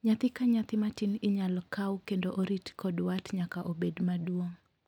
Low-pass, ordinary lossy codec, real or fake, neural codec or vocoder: 19.8 kHz; none; real; none